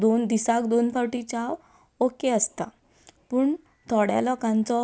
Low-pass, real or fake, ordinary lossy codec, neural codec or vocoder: none; real; none; none